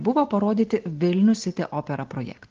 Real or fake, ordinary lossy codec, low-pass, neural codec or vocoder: real; Opus, 16 kbps; 7.2 kHz; none